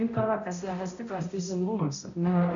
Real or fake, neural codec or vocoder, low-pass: fake; codec, 16 kHz, 0.5 kbps, X-Codec, HuBERT features, trained on balanced general audio; 7.2 kHz